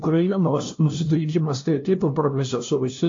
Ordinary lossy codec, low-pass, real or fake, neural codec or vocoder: AAC, 48 kbps; 7.2 kHz; fake; codec, 16 kHz, 0.5 kbps, FunCodec, trained on LibriTTS, 25 frames a second